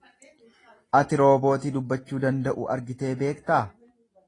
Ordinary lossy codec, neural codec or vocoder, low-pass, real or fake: AAC, 32 kbps; none; 10.8 kHz; real